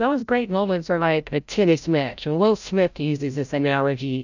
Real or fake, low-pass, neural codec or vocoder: fake; 7.2 kHz; codec, 16 kHz, 0.5 kbps, FreqCodec, larger model